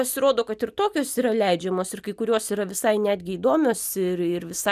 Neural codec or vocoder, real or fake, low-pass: none; real; 14.4 kHz